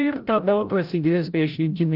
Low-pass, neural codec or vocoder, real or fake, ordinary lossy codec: 5.4 kHz; codec, 16 kHz, 0.5 kbps, FreqCodec, larger model; fake; Opus, 32 kbps